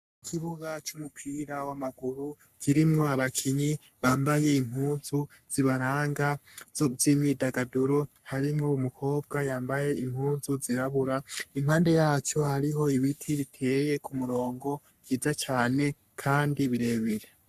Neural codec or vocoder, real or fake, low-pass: codec, 44.1 kHz, 3.4 kbps, Pupu-Codec; fake; 14.4 kHz